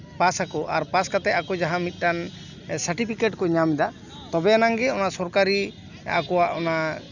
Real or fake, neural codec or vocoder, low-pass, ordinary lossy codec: real; none; 7.2 kHz; none